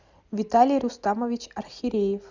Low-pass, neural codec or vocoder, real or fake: 7.2 kHz; none; real